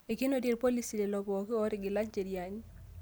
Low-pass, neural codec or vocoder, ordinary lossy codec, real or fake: none; none; none; real